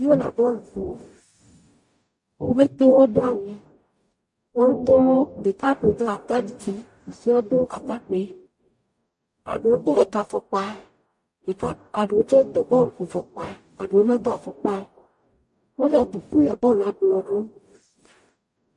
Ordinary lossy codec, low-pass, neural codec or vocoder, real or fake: MP3, 48 kbps; 10.8 kHz; codec, 44.1 kHz, 0.9 kbps, DAC; fake